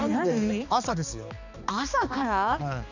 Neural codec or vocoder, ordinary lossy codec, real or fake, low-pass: codec, 16 kHz, 2 kbps, X-Codec, HuBERT features, trained on balanced general audio; none; fake; 7.2 kHz